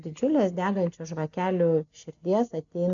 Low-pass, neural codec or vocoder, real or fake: 7.2 kHz; none; real